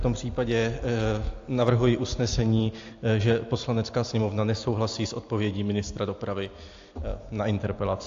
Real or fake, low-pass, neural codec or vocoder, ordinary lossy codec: real; 7.2 kHz; none; AAC, 48 kbps